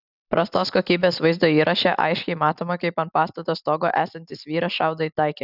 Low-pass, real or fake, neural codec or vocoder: 5.4 kHz; real; none